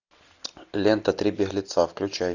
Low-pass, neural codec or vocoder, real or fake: 7.2 kHz; none; real